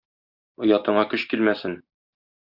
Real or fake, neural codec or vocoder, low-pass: real; none; 5.4 kHz